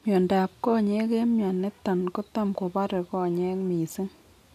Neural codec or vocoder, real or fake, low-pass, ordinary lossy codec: none; real; 14.4 kHz; none